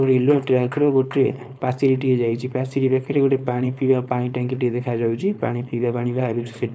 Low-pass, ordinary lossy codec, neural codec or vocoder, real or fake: none; none; codec, 16 kHz, 4.8 kbps, FACodec; fake